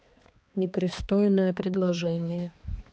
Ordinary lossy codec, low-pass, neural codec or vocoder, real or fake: none; none; codec, 16 kHz, 2 kbps, X-Codec, HuBERT features, trained on balanced general audio; fake